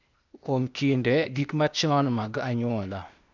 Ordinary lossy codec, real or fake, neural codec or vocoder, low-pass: none; fake; codec, 16 kHz, 0.8 kbps, ZipCodec; 7.2 kHz